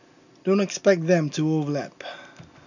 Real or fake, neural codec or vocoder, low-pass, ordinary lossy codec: real; none; 7.2 kHz; none